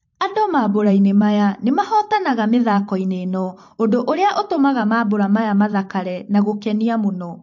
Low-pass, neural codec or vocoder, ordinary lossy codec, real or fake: 7.2 kHz; vocoder, 44.1 kHz, 128 mel bands every 512 samples, BigVGAN v2; MP3, 48 kbps; fake